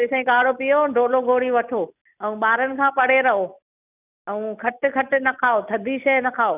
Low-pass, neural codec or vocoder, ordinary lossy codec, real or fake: 3.6 kHz; none; none; real